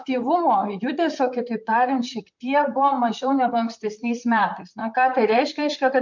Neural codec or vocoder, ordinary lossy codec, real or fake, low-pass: vocoder, 44.1 kHz, 128 mel bands, Pupu-Vocoder; MP3, 48 kbps; fake; 7.2 kHz